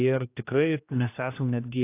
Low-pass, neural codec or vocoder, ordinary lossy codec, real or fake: 3.6 kHz; codec, 16 kHz, 1 kbps, X-Codec, HuBERT features, trained on general audio; AAC, 24 kbps; fake